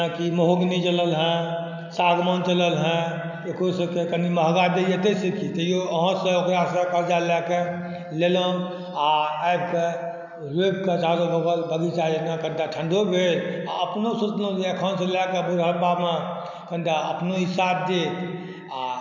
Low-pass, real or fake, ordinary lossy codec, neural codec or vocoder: 7.2 kHz; real; none; none